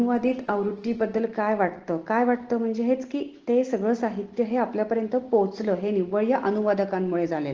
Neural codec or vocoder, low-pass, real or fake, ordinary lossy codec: none; 7.2 kHz; real; Opus, 16 kbps